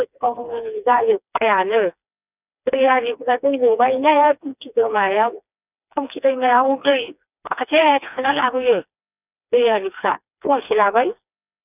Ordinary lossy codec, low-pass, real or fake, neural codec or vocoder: none; 3.6 kHz; fake; codec, 16 kHz, 2 kbps, FreqCodec, smaller model